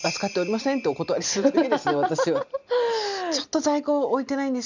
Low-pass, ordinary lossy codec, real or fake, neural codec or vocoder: 7.2 kHz; none; real; none